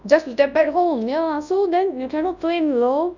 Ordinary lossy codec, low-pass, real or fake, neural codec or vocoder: none; 7.2 kHz; fake; codec, 24 kHz, 0.9 kbps, WavTokenizer, large speech release